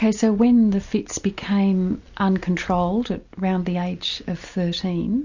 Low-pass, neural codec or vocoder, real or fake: 7.2 kHz; none; real